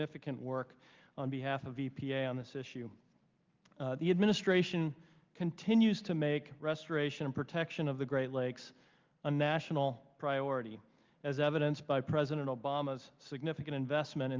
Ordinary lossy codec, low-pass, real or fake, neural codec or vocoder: Opus, 32 kbps; 7.2 kHz; real; none